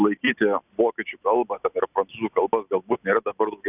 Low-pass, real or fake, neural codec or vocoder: 3.6 kHz; real; none